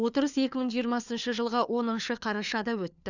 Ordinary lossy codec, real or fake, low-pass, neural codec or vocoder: none; fake; 7.2 kHz; codec, 16 kHz, 4 kbps, FunCodec, trained on LibriTTS, 50 frames a second